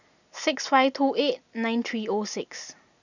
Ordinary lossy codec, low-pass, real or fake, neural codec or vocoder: none; 7.2 kHz; real; none